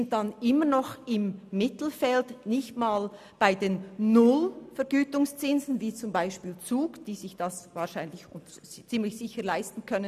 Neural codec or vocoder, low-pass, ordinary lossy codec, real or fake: none; 14.4 kHz; none; real